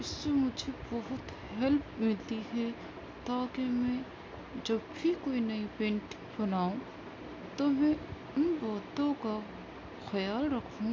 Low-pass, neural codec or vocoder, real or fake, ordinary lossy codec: none; none; real; none